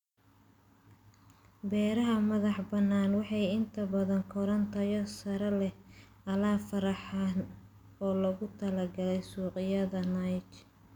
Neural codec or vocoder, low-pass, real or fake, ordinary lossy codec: none; 19.8 kHz; real; none